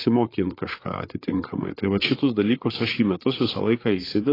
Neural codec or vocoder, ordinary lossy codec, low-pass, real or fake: codec, 16 kHz, 8 kbps, FreqCodec, larger model; AAC, 24 kbps; 5.4 kHz; fake